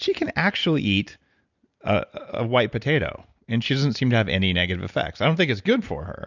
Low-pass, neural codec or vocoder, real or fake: 7.2 kHz; none; real